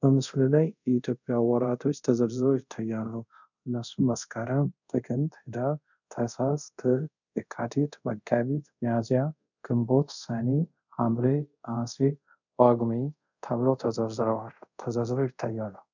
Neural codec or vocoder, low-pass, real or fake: codec, 24 kHz, 0.5 kbps, DualCodec; 7.2 kHz; fake